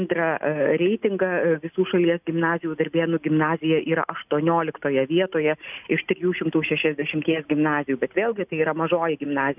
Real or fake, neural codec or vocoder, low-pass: real; none; 3.6 kHz